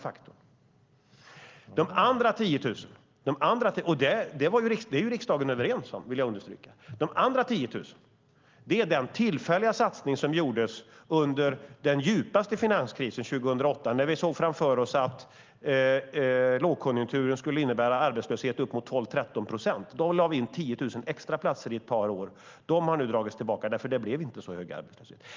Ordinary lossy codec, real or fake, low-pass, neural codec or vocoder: Opus, 32 kbps; real; 7.2 kHz; none